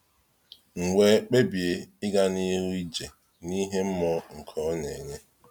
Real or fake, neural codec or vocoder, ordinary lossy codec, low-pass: real; none; none; none